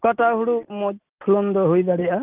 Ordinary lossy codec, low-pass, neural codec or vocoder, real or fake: Opus, 32 kbps; 3.6 kHz; none; real